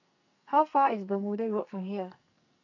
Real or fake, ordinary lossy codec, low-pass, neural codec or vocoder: fake; none; 7.2 kHz; codec, 44.1 kHz, 2.6 kbps, SNAC